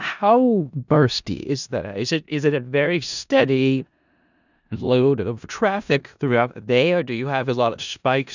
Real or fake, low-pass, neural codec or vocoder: fake; 7.2 kHz; codec, 16 kHz in and 24 kHz out, 0.4 kbps, LongCat-Audio-Codec, four codebook decoder